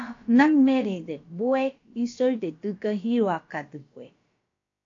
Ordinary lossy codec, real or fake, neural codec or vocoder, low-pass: AAC, 48 kbps; fake; codec, 16 kHz, about 1 kbps, DyCAST, with the encoder's durations; 7.2 kHz